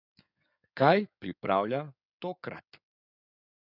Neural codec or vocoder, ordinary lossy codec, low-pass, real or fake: codec, 16 kHz in and 24 kHz out, 2.2 kbps, FireRedTTS-2 codec; none; 5.4 kHz; fake